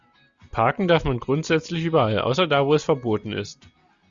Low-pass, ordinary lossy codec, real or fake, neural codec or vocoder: 7.2 kHz; Opus, 64 kbps; real; none